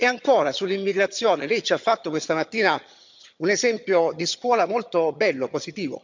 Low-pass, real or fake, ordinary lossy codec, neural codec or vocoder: 7.2 kHz; fake; MP3, 64 kbps; vocoder, 22.05 kHz, 80 mel bands, HiFi-GAN